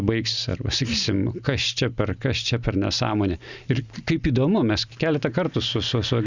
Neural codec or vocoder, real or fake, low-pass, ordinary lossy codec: none; real; 7.2 kHz; Opus, 64 kbps